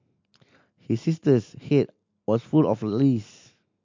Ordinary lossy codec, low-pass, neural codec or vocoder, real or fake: MP3, 48 kbps; 7.2 kHz; none; real